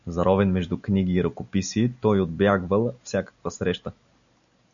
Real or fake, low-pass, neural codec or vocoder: real; 7.2 kHz; none